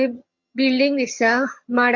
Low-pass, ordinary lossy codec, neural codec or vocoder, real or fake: 7.2 kHz; MP3, 48 kbps; vocoder, 22.05 kHz, 80 mel bands, HiFi-GAN; fake